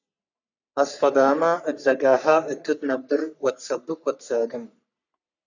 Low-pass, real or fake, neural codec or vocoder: 7.2 kHz; fake; codec, 44.1 kHz, 3.4 kbps, Pupu-Codec